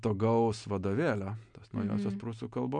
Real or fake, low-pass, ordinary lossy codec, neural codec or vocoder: real; 9.9 kHz; MP3, 96 kbps; none